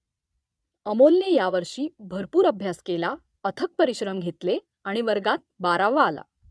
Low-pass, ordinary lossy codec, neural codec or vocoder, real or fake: none; none; vocoder, 22.05 kHz, 80 mel bands, Vocos; fake